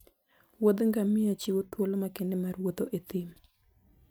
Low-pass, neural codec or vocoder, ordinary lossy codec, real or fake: none; none; none; real